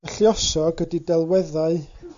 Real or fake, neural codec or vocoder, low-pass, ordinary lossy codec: real; none; 7.2 kHz; MP3, 64 kbps